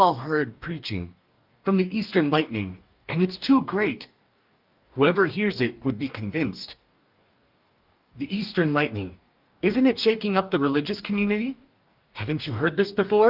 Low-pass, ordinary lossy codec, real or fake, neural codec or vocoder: 5.4 kHz; Opus, 24 kbps; fake; codec, 44.1 kHz, 2.6 kbps, DAC